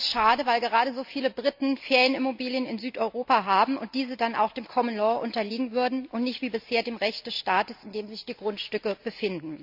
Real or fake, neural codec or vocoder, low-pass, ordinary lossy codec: real; none; 5.4 kHz; MP3, 48 kbps